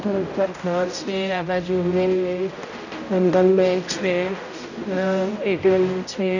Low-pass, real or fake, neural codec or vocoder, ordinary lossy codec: 7.2 kHz; fake; codec, 16 kHz, 0.5 kbps, X-Codec, HuBERT features, trained on general audio; none